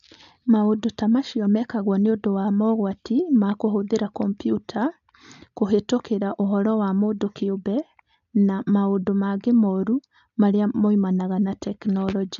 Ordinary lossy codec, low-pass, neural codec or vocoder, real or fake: none; 7.2 kHz; none; real